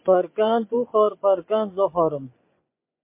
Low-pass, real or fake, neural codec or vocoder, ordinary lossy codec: 3.6 kHz; fake; vocoder, 24 kHz, 100 mel bands, Vocos; MP3, 24 kbps